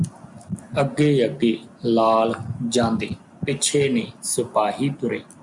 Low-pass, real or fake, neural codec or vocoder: 10.8 kHz; real; none